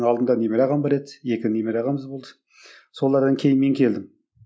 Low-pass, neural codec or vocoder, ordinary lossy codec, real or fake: none; none; none; real